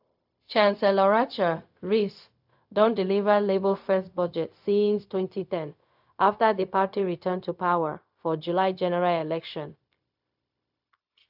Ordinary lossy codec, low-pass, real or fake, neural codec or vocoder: AAC, 48 kbps; 5.4 kHz; fake; codec, 16 kHz, 0.4 kbps, LongCat-Audio-Codec